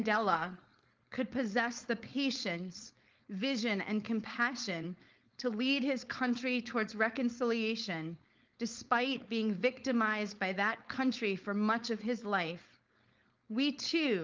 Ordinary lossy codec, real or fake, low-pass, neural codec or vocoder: Opus, 24 kbps; fake; 7.2 kHz; codec, 16 kHz, 4.8 kbps, FACodec